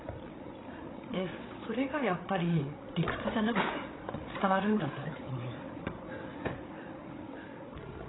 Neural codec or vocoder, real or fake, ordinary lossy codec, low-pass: codec, 16 kHz, 8 kbps, FreqCodec, larger model; fake; AAC, 16 kbps; 7.2 kHz